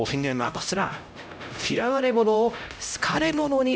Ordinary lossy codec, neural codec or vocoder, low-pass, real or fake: none; codec, 16 kHz, 0.5 kbps, X-Codec, HuBERT features, trained on LibriSpeech; none; fake